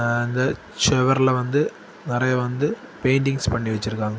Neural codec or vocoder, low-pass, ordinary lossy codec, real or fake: none; none; none; real